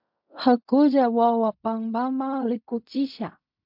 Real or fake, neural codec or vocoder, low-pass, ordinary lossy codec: fake; codec, 16 kHz in and 24 kHz out, 0.4 kbps, LongCat-Audio-Codec, fine tuned four codebook decoder; 5.4 kHz; MP3, 48 kbps